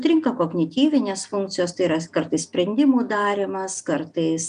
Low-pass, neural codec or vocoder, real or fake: 9.9 kHz; none; real